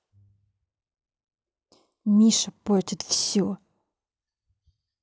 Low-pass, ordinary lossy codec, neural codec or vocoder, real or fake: none; none; none; real